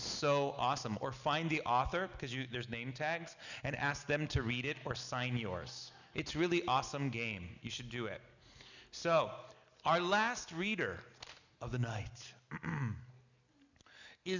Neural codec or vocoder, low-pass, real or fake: none; 7.2 kHz; real